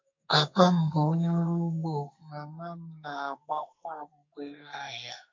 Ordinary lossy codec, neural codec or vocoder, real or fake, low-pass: MP3, 48 kbps; codec, 44.1 kHz, 2.6 kbps, SNAC; fake; 7.2 kHz